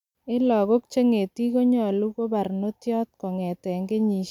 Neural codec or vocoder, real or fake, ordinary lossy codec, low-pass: none; real; none; 19.8 kHz